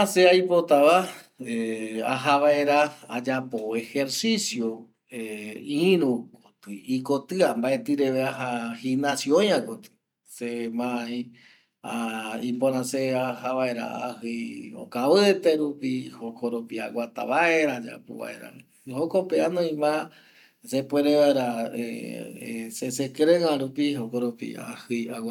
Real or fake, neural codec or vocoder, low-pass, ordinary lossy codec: real; none; 19.8 kHz; none